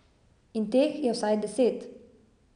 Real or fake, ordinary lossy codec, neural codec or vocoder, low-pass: real; none; none; 9.9 kHz